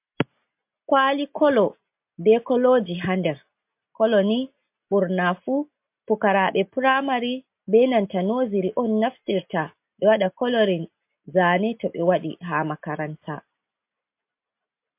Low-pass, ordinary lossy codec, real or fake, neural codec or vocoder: 3.6 kHz; MP3, 32 kbps; real; none